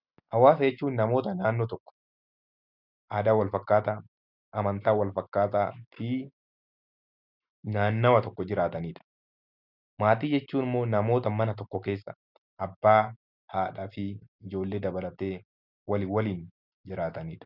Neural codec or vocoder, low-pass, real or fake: none; 5.4 kHz; real